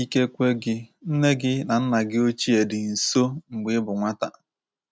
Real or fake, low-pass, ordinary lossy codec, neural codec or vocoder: real; none; none; none